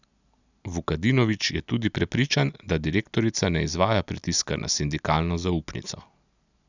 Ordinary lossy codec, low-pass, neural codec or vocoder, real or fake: none; 7.2 kHz; none; real